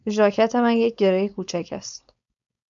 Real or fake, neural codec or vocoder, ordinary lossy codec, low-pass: fake; codec, 16 kHz, 4.8 kbps, FACodec; MP3, 96 kbps; 7.2 kHz